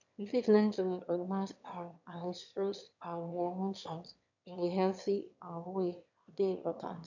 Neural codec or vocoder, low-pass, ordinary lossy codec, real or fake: autoencoder, 22.05 kHz, a latent of 192 numbers a frame, VITS, trained on one speaker; 7.2 kHz; none; fake